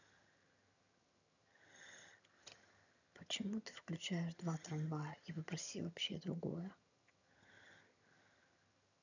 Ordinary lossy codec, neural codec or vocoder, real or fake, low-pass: none; vocoder, 22.05 kHz, 80 mel bands, HiFi-GAN; fake; 7.2 kHz